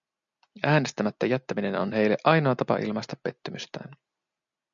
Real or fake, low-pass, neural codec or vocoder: real; 7.2 kHz; none